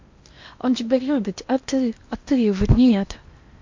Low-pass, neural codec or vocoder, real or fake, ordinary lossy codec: 7.2 kHz; codec, 16 kHz in and 24 kHz out, 0.8 kbps, FocalCodec, streaming, 65536 codes; fake; MP3, 48 kbps